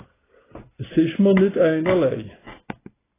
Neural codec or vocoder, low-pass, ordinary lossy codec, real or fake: none; 3.6 kHz; AAC, 16 kbps; real